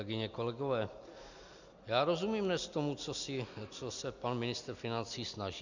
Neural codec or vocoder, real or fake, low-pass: none; real; 7.2 kHz